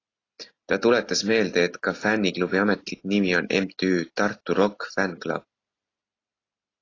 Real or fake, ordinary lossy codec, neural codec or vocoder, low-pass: real; AAC, 32 kbps; none; 7.2 kHz